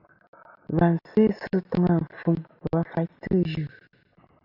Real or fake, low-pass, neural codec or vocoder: real; 5.4 kHz; none